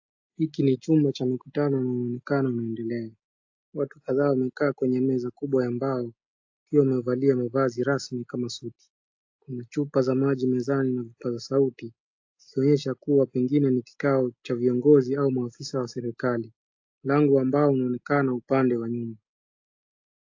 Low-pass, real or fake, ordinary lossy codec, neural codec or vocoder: 7.2 kHz; real; AAC, 48 kbps; none